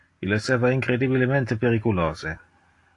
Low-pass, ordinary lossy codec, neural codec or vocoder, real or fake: 10.8 kHz; AAC, 48 kbps; none; real